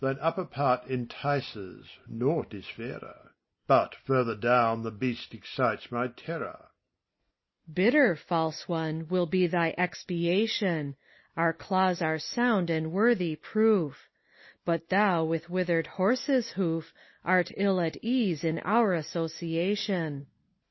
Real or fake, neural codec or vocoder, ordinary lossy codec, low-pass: real; none; MP3, 24 kbps; 7.2 kHz